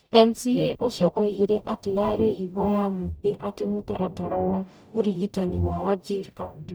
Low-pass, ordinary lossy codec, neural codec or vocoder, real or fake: none; none; codec, 44.1 kHz, 0.9 kbps, DAC; fake